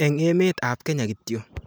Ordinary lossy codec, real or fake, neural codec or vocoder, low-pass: none; real; none; none